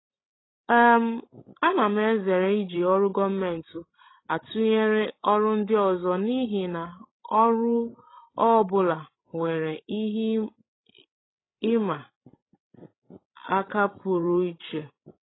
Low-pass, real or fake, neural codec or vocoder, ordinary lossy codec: 7.2 kHz; fake; codec, 16 kHz, 16 kbps, FreqCodec, larger model; AAC, 16 kbps